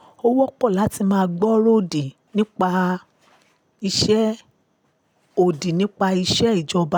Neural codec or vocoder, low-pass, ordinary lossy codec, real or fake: none; none; none; real